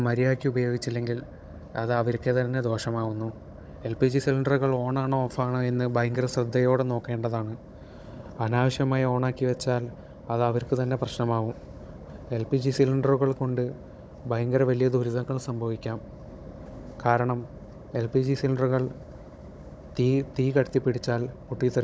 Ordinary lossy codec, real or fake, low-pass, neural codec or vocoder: none; fake; none; codec, 16 kHz, 16 kbps, FunCodec, trained on Chinese and English, 50 frames a second